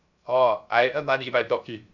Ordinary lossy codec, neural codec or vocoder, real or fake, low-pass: none; codec, 16 kHz, 0.3 kbps, FocalCodec; fake; 7.2 kHz